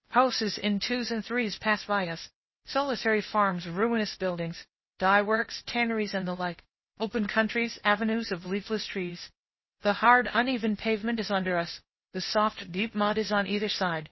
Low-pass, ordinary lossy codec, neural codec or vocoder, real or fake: 7.2 kHz; MP3, 24 kbps; codec, 16 kHz, 0.8 kbps, ZipCodec; fake